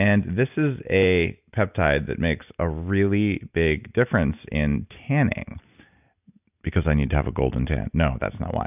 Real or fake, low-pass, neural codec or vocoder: real; 3.6 kHz; none